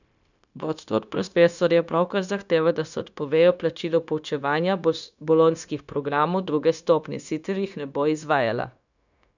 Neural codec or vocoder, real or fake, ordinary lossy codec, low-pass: codec, 16 kHz, 0.9 kbps, LongCat-Audio-Codec; fake; none; 7.2 kHz